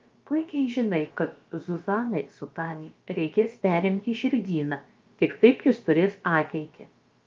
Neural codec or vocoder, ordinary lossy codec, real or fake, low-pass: codec, 16 kHz, about 1 kbps, DyCAST, with the encoder's durations; Opus, 24 kbps; fake; 7.2 kHz